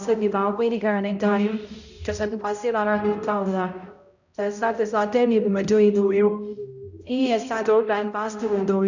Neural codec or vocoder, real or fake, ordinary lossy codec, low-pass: codec, 16 kHz, 0.5 kbps, X-Codec, HuBERT features, trained on balanced general audio; fake; none; 7.2 kHz